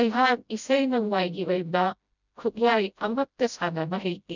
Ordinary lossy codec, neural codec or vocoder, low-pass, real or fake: none; codec, 16 kHz, 0.5 kbps, FreqCodec, smaller model; 7.2 kHz; fake